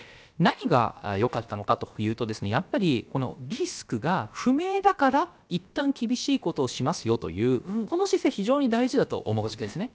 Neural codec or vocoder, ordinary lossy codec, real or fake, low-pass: codec, 16 kHz, about 1 kbps, DyCAST, with the encoder's durations; none; fake; none